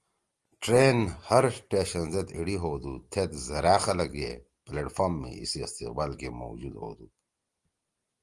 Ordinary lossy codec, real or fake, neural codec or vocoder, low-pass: Opus, 24 kbps; real; none; 10.8 kHz